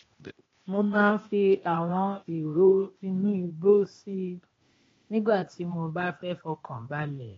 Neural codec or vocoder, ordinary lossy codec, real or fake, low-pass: codec, 16 kHz, 0.8 kbps, ZipCodec; AAC, 32 kbps; fake; 7.2 kHz